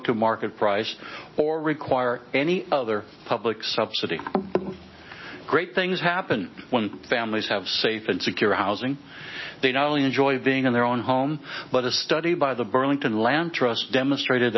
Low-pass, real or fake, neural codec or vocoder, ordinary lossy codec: 7.2 kHz; real; none; MP3, 24 kbps